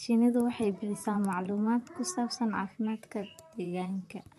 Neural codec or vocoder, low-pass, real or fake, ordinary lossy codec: vocoder, 44.1 kHz, 128 mel bands, Pupu-Vocoder; 14.4 kHz; fake; MP3, 64 kbps